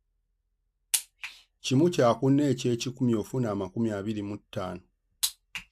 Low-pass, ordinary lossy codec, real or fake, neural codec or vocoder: 14.4 kHz; AAC, 96 kbps; real; none